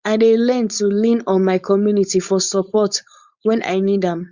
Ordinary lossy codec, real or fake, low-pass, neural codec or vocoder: Opus, 64 kbps; fake; 7.2 kHz; codec, 16 kHz, 4.8 kbps, FACodec